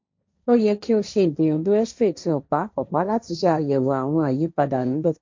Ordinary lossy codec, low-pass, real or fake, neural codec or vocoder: MP3, 64 kbps; 7.2 kHz; fake; codec, 16 kHz, 1.1 kbps, Voila-Tokenizer